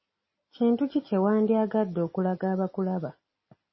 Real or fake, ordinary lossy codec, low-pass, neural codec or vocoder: real; MP3, 24 kbps; 7.2 kHz; none